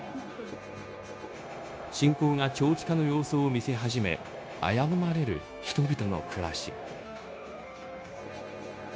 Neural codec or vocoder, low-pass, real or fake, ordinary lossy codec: codec, 16 kHz, 0.9 kbps, LongCat-Audio-Codec; none; fake; none